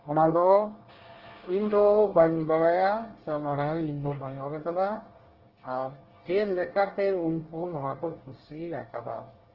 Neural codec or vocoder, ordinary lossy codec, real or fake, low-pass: codec, 24 kHz, 1 kbps, SNAC; Opus, 16 kbps; fake; 5.4 kHz